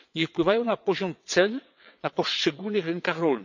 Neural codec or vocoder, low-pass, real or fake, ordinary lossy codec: vocoder, 22.05 kHz, 80 mel bands, WaveNeXt; 7.2 kHz; fake; none